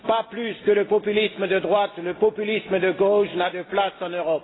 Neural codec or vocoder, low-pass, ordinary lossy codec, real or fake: none; 7.2 kHz; AAC, 16 kbps; real